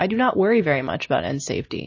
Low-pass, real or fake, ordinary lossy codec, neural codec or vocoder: 7.2 kHz; real; MP3, 32 kbps; none